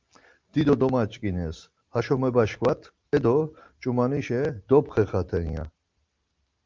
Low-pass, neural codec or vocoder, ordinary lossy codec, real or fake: 7.2 kHz; none; Opus, 32 kbps; real